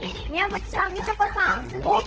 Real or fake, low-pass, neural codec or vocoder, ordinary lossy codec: fake; 7.2 kHz; codec, 16 kHz, 16 kbps, FunCodec, trained on LibriTTS, 50 frames a second; Opus, 16 kbps